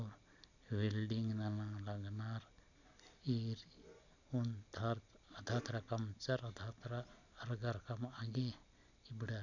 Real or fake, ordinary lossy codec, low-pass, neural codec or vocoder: real; none; 7.2 kHz; none